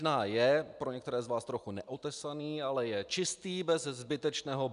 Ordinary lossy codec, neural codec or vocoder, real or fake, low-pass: MP3, 96 kbps; none; real; 10.8 kHz